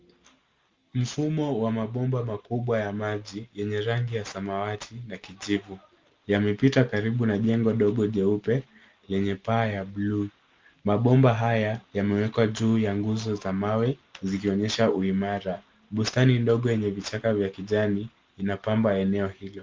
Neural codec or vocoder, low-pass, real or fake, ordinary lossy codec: none; 7.2 kHz; real; Opus, 32 kbps